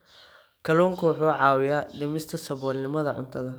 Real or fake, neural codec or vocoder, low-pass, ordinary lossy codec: fake; codec, 44.1 kHz, 7.8 kbps, DAC; none; none